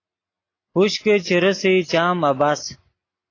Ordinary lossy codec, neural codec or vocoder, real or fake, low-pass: AAC, 32 kbps; none; real; 7.2 kHz